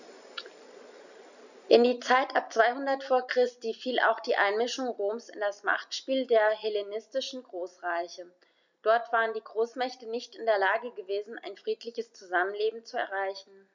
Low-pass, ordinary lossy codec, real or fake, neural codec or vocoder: 7.2 kHz; none; real; none